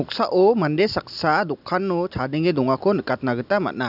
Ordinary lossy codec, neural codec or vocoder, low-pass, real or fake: none; none; 5.4 kHz; real